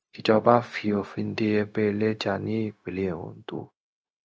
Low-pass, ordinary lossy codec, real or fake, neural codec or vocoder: none; none; fake; codec, 16 kHz, 0.4 kbps, LongCat-Audio-Codec